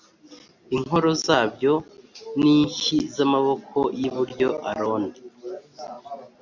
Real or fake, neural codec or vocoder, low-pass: real; none; 7.2 kHz